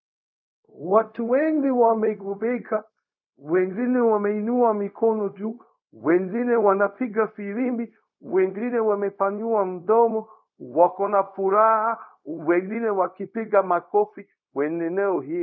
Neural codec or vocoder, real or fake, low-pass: codec, 16 kHz, 0.4 kbps, LongCat-Audio-Codec; fake; 7.2 kHz